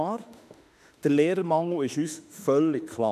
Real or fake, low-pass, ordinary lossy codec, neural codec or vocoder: fake; 14.4 kHz; none; autoencoder, 48 kHz, 32 numbers a frame, DAC-VAE, trained on Japanese speech